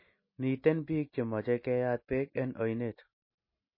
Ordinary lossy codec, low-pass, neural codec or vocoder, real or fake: MP3, 24 kbps; 5.4 kHz; none; real